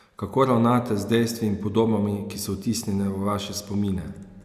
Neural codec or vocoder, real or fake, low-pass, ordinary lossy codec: none; real; 14.4 kHz; Opus, 64 kbps